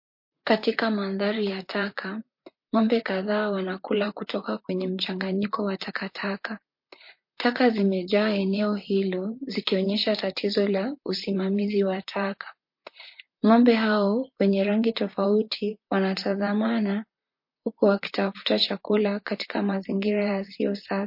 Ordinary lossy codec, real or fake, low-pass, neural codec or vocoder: MP3, 32 kbps; fake; 5.4 kHz; vocoder, 44.1 kHz, 128 mel bands, Pupu-Vocoder